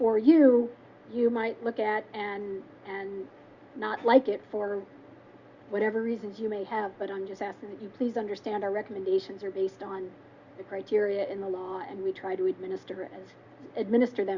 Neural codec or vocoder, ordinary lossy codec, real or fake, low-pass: none; Opus, 64 kbps; real; 7.2 kHz